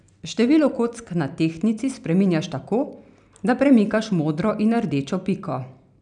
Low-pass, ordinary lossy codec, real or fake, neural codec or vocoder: 9.9 kHz; none; real; none